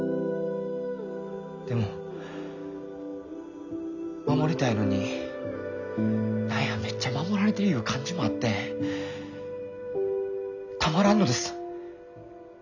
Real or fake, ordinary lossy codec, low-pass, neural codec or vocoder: real; none; 7.2 kHz; none